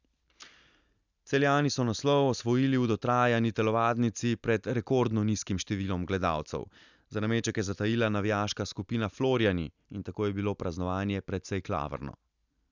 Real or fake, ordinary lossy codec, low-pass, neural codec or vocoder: real; none; 7.2 kHz; none